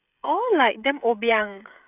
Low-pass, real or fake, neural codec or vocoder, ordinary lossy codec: 3.6 kHz; fake; codec, 16 kHz, 16 kbps, FreqCodec, smaller model; none